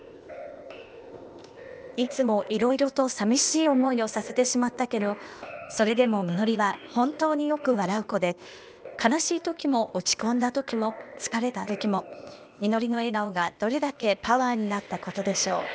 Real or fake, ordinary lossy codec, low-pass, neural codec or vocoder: fake; none; none; codec, 16 kHz, 0.8 kbps, ZipCodec